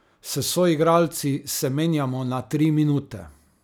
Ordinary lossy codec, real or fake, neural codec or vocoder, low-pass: none; real; none; none